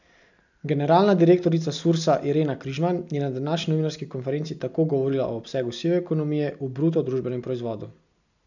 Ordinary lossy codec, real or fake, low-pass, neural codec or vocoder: none; real; 7.2 kHz; none